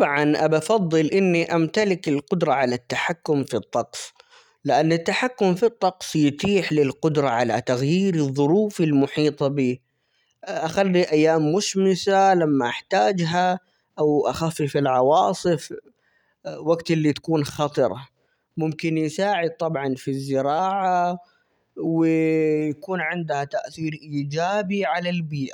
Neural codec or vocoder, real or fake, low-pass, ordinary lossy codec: none; real; 19.8 kHz; none